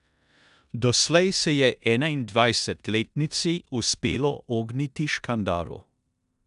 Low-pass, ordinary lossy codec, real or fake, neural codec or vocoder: 10.8 kHz; none; fake; codec, 16 kHz in and 24 kHz out, 0.9 kbps, LongCat-Audio-Codec, four codebook decoder